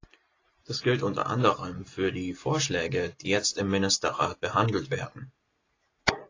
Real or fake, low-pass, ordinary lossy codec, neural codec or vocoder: real; 7.2 kHz; AAC, 32 kbps; none